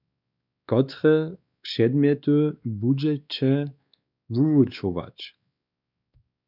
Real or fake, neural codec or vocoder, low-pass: fake; codec, 16 kHz, 2 kbps, X-Codec, WavLM features, trained on Multilingual LibriSpeech; 5.4 kHz